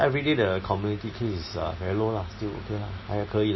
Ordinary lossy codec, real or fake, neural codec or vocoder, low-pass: MP3, 24 kbps; real; none; 7.2 kHz